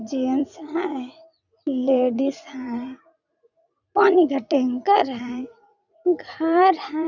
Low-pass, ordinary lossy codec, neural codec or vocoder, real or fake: 7.2 kHz; none; vocoder, 44.1 kHz, 80 mel bands, Vocos; fake